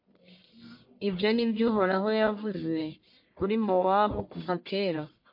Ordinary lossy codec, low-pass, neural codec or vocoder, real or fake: MP3, 32 kbps; 5.4 kHz; codec, 44.1 kHz, 1.7 kbps, Pupu-Codec; fake